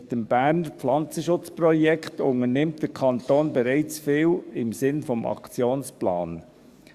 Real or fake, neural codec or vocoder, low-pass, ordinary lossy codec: fake; codec, 44.1 kHz, 7.8 kbps, DAC; 14.4 kHz; Opus, 64 kbps